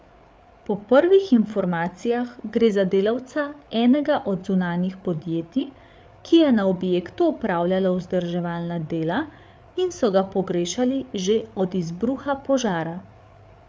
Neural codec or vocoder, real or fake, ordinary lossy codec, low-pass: codec, 16 kHz, 8 kbps, FreqCodec, larger model; fake; none; none